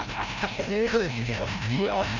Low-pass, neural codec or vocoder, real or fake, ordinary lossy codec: 7.2 kHz; codec, 16 kHz, 0.5 kbps, FreqCodec, larger model; fake; none